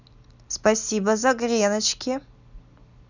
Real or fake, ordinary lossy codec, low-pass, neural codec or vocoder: real; none; 7.2 kHz; none